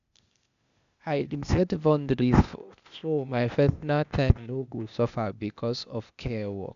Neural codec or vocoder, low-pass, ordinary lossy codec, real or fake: codec, 16 kHz, 0.8 kbps, ZipCodec; 7.2 kHz; none; fake